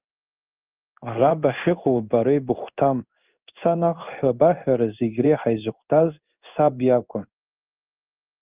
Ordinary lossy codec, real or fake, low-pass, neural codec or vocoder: Opus, 24 kbps; fake; 3.6 kHz; codec, 16 kHz in and 24 kHz out, 1 kbps, XY-Tokenizer